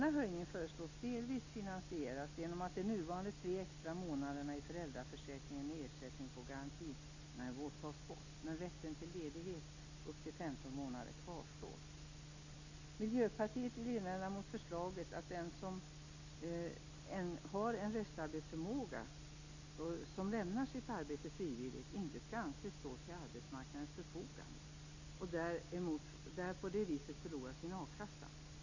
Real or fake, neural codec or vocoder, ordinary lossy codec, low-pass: real; none; Opus, 64 kbps; 7.2 kHz